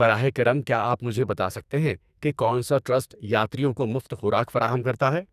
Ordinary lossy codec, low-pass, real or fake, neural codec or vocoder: none; 14.4 kHz; fake; codec, 44.1 kHz, 2.6 kbps, SNAC